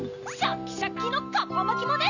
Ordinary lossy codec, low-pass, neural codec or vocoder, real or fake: none; 7.2 kHz; none; real